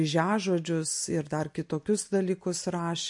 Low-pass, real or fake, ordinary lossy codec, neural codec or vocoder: 10.8 kHz; real; MP3, 48 kbps; none